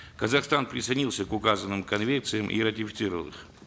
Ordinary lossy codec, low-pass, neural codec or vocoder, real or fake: none; none; none; real